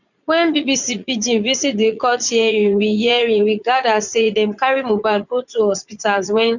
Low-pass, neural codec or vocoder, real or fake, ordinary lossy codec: 7.2 kHz; vocoder, 44.1 kHz, 80 mel bands, Vocos; fake; none